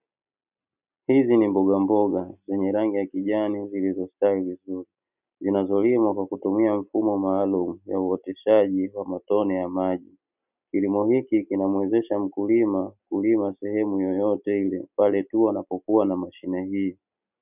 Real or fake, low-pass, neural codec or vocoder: real; 3.6 kHz; none